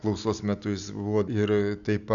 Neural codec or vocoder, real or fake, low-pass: none; real; 7.2 kHz